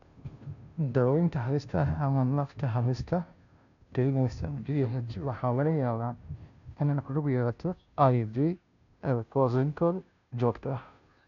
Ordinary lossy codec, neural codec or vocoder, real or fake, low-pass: none; codec, 16 kHz, 0.5 kbps, FunCodec, trained on Chinese and English, 25 frames a second; fake; 7.2 kHz